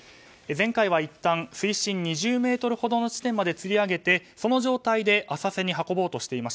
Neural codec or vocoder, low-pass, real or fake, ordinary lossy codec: none; none; real; none